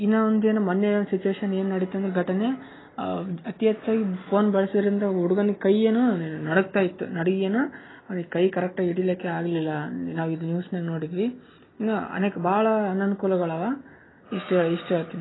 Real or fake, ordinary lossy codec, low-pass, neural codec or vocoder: real; AAC, 16 kbps; 7.2 kHz; none